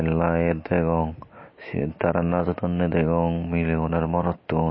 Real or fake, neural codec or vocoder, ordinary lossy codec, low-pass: real; none; MP3, 24 kbps; 7.2 kHz